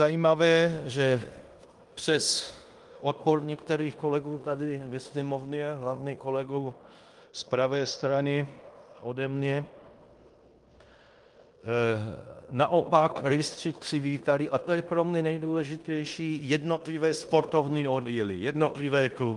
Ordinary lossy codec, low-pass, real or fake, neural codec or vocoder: Opus, 32 kbps; 10.8 kHz; fake; codec, 16 kHz in and 24 kHz out, 0.9 kbps, LongCat-Audio-Codec, fine tuned four codebook decoder